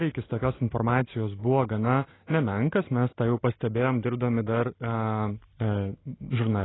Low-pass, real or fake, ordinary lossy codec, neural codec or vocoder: 7.2 kHz; real; AAC, 16 kbps; none